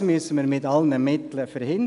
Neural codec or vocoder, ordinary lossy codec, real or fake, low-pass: none; none; real; 10.8 kHz